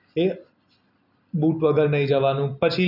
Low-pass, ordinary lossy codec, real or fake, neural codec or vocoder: 5.4 kHz; none; real; none